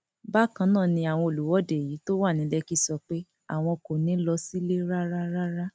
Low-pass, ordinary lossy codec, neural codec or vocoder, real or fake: none; none; none; real